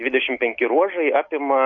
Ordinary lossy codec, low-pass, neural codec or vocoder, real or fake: MP3, 48 kbps; 7.2 kHz; none; real